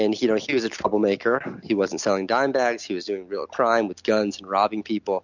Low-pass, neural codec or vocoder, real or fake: 7.2 kHz; none; real